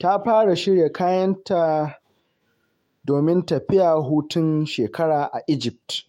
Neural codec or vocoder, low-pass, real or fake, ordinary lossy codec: autoencoder, 48 kHz, 128 numbers a frame, DAC-VAE, trained on Japanese speech; 19.8 kHz; fake; MP3, 64 kbps